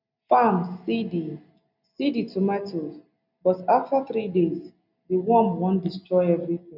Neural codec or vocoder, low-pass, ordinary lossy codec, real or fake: none; 5.4 kHz; none; real